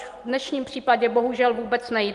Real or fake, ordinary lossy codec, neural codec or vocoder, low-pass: real; Opus, 24 kbps; none; 10.8 kHz